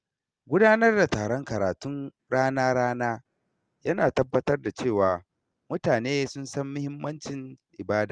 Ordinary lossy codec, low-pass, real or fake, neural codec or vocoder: Opus, 24 kbps; 9.9 kHz; real; none